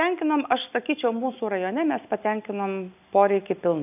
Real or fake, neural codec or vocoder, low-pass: real; none; 3.6 kHz